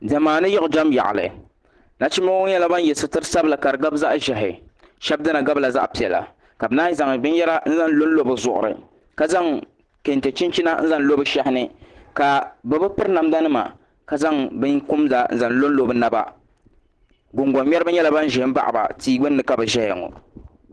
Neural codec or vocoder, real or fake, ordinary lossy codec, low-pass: none; real; Opus, 16 kbps; 10.8 kHz